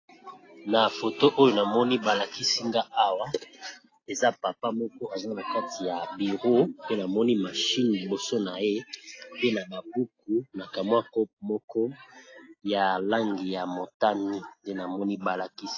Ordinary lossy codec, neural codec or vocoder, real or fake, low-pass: AAC, 32 kbps; none; real; 7.2 kHz